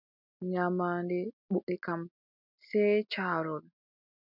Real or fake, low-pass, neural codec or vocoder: real; 5.4 kHz; none